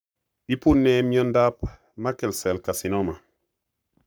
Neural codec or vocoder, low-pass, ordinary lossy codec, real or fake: codec, 44.1 kHz, 7.8 kbps, Pupu-Codec; none; none; fake